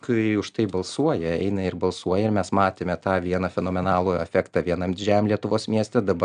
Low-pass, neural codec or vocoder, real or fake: 9.9 kHz; none; real